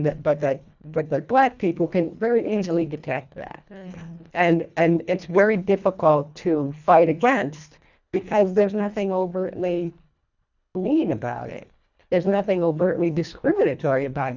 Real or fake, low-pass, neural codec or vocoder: fake; 7.2 kHz; codec, 24 kHz, 1.5 kbps, HILCodec